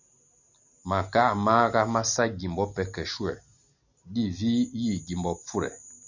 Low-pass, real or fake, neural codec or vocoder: 7.2 kHz; real; none